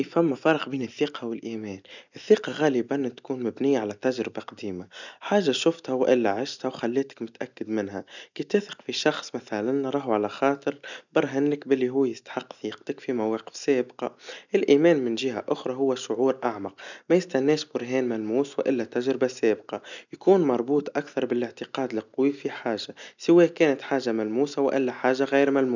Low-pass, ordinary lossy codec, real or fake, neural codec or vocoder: 7.2 kHz; none; real; none